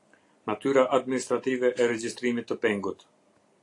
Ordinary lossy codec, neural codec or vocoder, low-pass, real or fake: AAC, 48 kbps; none; 10.8 kHz; real